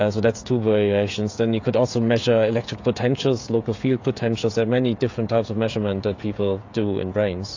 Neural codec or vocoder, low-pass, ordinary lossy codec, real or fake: codec, 16 kHz, 16 kbps, FreqCodec, smaller model; 7.2 kHz; AAC, 48 kbps; fake